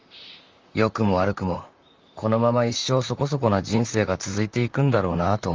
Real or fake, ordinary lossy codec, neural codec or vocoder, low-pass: fake; Opus, 32 kbps; vocoder, 44.1 kHz, 128 mel bands, Pupu-Vocoder; 7.2 kHz